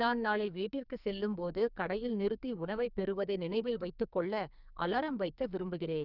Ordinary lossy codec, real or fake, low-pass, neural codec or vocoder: none; fake; 5.4 kHz; codec, 44.1 kHz, 2.6 kbps, SNAC